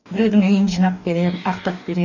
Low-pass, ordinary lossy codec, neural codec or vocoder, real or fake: 7.2 kHz; none; codec, 44.1 kHz, 2.6 kbps, DAC; fake